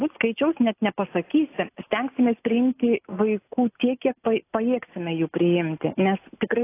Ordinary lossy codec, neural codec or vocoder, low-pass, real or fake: AAC, 24 kbps; none; 3.6 kHz; real